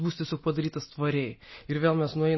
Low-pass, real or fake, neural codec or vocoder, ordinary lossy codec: 7.2 kHz; real; none; MP3, 24 kbps